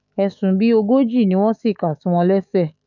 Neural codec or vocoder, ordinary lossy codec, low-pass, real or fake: autoencoder, 48 kHz, 128 numbers a frame, DAC-VAE, trained on Japanese speech; none; 7.2 kHz; fake